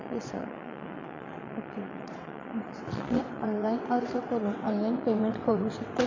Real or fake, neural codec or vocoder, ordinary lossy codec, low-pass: fake; vocoder, 22.05 kHz, 80 mel bands, WaveNeXt; AAC, 48 kbps; 7.2 kHz